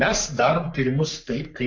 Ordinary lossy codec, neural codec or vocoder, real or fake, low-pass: MP3, 48 kbps; codec, 44.1 kHz, 3.4 kbps, Pupu-Codec; fake; 7.2 kHz